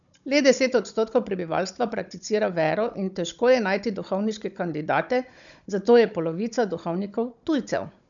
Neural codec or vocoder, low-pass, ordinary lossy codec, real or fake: codec, 16 kHz, 16 kbps, FunCodec, trained on Chinese and English, 50 frames a second; 7.2 kHz; none; fake